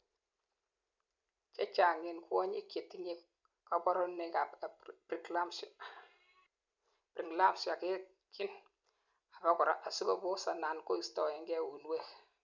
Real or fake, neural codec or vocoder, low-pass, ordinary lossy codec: real; none; 7.2 kHz; none